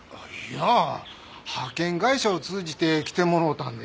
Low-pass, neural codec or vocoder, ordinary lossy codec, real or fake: none; none; none; real